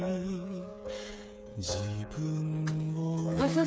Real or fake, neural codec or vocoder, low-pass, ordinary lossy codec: fake; codec, 16 kHz, 8 kbps, FreqCodec, smaller model; none; none